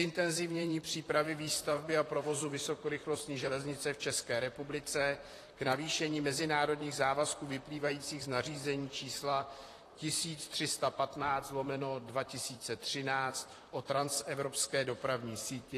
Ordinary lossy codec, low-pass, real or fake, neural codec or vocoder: AAC, 48 kbps; 14.4 kHz; fake; vocoder, 44.1 kHz, 128 mel bands, Pupu-Vocoder